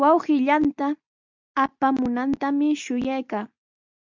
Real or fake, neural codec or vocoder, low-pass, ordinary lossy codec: real; none; 7.2 kHz; MP3, 64 kbps